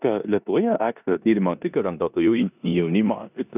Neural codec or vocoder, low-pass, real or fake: codec, 16 kHz in and 24 kHz out, 0.9 kbps, LongCat-Audio-Codec, four codebook decoder; 3.6 kHz; fake